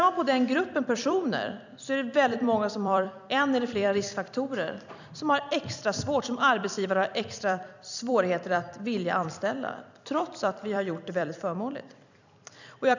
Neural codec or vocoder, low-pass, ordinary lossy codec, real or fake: vocoder, 44.1 kHz, 128 mel bands every 256 samples, BigVGAN v2; 7.2 kHz; none; fake